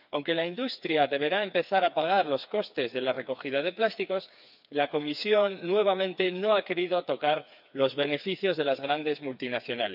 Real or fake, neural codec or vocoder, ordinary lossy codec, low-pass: fake; codec, 16 kHz, 4 kbps, FreqCodec, smaller model; none; 5.4 kHz